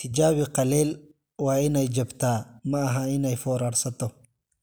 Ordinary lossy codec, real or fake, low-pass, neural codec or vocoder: none; real; none; none